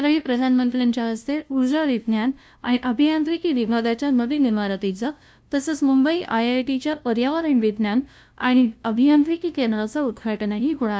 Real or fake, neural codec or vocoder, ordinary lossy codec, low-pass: fake; codec, 16 kHz, 0.5 kbps, FunCodec, trained on LibriTTS, 25 frames a second; none; none